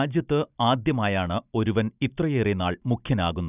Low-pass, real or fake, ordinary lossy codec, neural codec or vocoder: 3.6 kHz; real; none; none